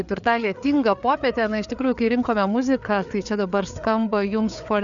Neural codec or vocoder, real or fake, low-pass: codec, 16 kHz, 4 kbps, FreqCodec, larger model; fake; 7.2 kHz